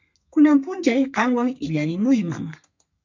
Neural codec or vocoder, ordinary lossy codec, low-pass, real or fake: codec, 32 kHz, 1.9 kbps, SNAC; MP3, 64 kbps; 7.2 kHz; fake